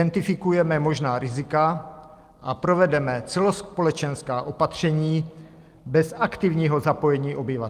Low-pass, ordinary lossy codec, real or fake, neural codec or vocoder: 14.4 kHz; Opus, 32 kbps; real; none